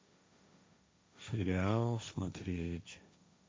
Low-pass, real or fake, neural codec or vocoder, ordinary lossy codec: none; fake; codec, 16 kHz, 1.1 kbps, Voila-Tokenizer; none